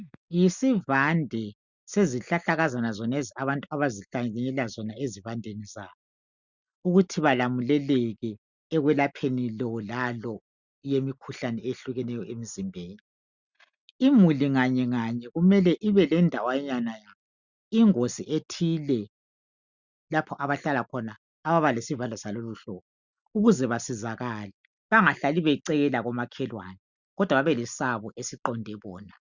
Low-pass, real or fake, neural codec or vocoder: 7.2 kHz; real; none